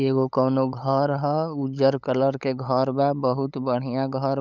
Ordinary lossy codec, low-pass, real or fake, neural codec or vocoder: none; 7.2 kHz; fake; codec, 16 kHz, 8 kbps, FunCodec, trained on LibriTTS, 25 frames a second